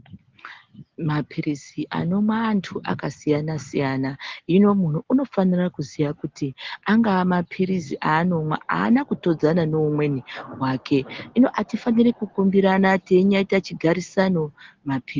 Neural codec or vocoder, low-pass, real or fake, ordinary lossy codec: none; 7.2 kHz; real; Opus, 16 kbps